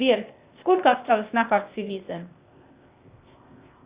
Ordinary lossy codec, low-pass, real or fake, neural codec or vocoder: Opus, 64 kbps; 3.6 kHz; fake; codec, 16 kHz, 0.8 kbps, ZipCodec